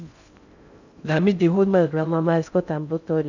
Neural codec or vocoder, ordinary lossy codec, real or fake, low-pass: codec, 16 kHz in and 24 kHz out, 0.6 kbps, FocalCodec, streaming, 2048 codes; none; fake; 7.2 kHz